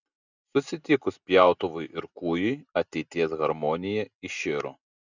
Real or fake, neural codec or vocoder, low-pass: real; none; 7.2 kHz